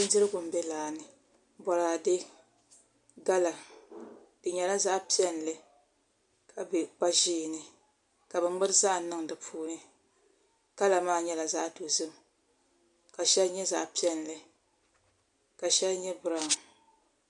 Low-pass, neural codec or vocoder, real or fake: 10.8 kHz; none; real